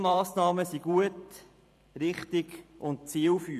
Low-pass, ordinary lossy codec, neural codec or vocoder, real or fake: 14.4 kHz; none; vocoder, 44.1 kHz, 128 mel bands every 512 samples, BigVGAN v2; fake